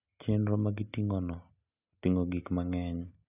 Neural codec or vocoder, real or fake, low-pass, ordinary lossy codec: none; real; 3.6 kHz; none